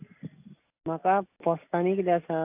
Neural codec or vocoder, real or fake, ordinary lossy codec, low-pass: none; real; AAC, 24 kbps; 3.6 kHz